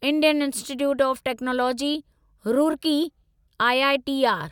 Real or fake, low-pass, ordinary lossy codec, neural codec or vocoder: real; 19.8 kHz; none; none